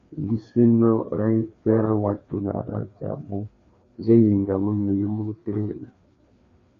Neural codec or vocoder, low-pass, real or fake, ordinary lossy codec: codec, 16 kHz, 2 kbps, FreqCodec, larger model; 7.2 kHz; fake; AAC, 32 kbps